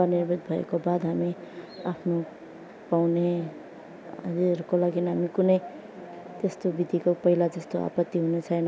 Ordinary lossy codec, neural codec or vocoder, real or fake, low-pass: none; none; real; none